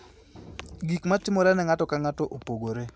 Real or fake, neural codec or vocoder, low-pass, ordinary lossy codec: real; none; none; none